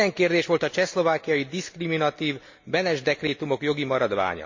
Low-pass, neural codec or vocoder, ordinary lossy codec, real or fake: 7.2 kHz; none; MP3, 48 kbps; real